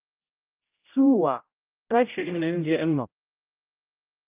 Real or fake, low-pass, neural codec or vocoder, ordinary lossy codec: fake; 3.6 kHz; codec, 16 kHz, 0.5 kbps, X-Codec, HuBERT features, trained on general audio; Opus, 24 kbps